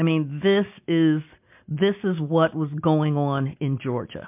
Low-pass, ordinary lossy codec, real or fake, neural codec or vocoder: 3.6 kHz; MP3, 32 kbps; real; none